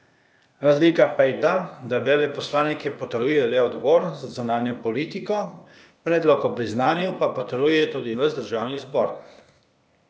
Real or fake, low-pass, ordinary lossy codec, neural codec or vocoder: fake; none; none; codec, 16 kHz, 0.8 kbps, ZipCodec